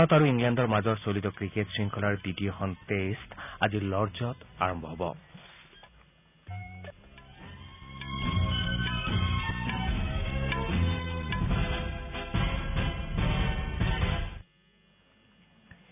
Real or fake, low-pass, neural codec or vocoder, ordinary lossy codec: real; 3.6 kHz; none; none